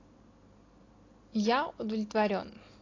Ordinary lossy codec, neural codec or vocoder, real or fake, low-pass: AAC, 32 kbps; none; real; 7.2 kHz